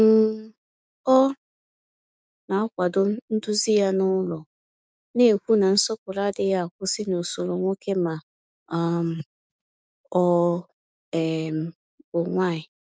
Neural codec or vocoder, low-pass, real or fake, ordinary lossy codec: codec, 16 kHz, 6 kbps, DAC; none; fake; none